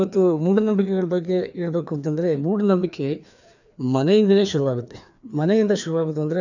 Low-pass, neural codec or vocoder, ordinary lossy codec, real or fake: 7.2 kHz; codec, 16 kHz, 2 kbps, FreqCodec, larger model; none; fake